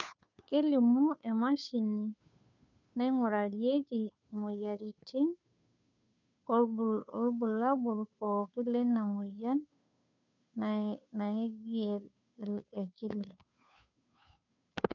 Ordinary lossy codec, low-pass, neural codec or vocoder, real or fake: none; 7.2 kHz; codec, 16 kHz, 2 kbps, FunCodec, trained on Chinese and English, 25 frames a second; fake